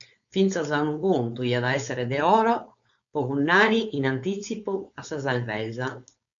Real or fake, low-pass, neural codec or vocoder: fake; 7.2 kHz; codec, 16 kHz, 4.8 kbps, FACodec